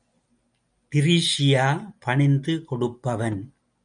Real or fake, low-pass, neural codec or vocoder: real; 9.9 kHz; none